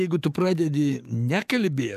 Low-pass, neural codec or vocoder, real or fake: 14.4 kHz; codec, 44.1 kHz, 7.8 kbps, DAC; fake